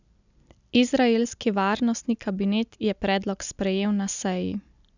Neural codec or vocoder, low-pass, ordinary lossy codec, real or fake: none; 7.2 kHz; none; real